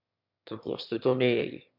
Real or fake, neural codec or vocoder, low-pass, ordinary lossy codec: fake; autoencoder, 22.05 kHz, a latent of 192 numbers a frame, VITS, trained on one speaker; 5.4 kHz; MP3, 48 kbps